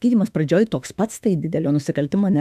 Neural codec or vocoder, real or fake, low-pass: autoencoder, 48 kHz, 32 numbers a frame, DAC-VAE, trained on Japanese speech; fake; 14.4 kHz